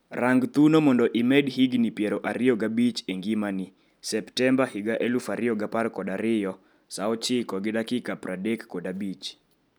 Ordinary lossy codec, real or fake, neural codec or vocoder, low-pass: none; real; none; none